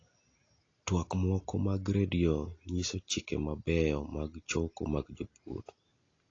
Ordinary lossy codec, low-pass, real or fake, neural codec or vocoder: AAC, 32 kbps; 7.2 kHz; real; none